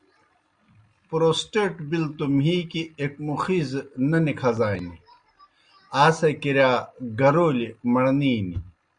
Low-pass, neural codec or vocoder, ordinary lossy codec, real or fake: 9.9 kHz; none; Opus, 64 kbps; real